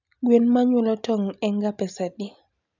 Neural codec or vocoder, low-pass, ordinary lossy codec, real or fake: none; 7.2 kHz; none; real